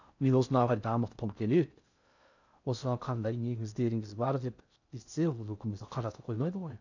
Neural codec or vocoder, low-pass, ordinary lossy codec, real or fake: codec, 16 kHz in and 24 kHz out, 0.6 kbps, FocalCodec, streaming, 4096 codes; 7.2 kHz; none; fake